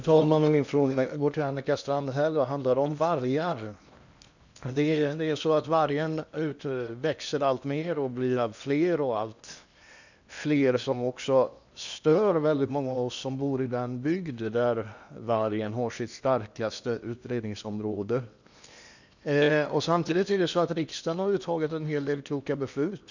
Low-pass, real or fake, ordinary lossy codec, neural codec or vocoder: 7.2 kHz; fake; none; codec, 16 kHz in and 24 kHz out, 0.8 kbps, FocalCodec, streaming, 65536 codes